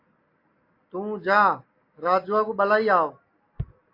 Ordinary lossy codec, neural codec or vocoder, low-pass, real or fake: AAC, 32 kbps; none; 5.4 kHz; real